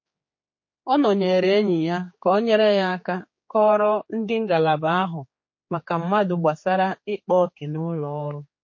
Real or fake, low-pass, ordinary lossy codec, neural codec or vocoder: fake; 7.2 kHz; MP3, 32 kbps; codec, 16 kHz, 4 kbps, X-Codec, HuBERT features, trained on general audio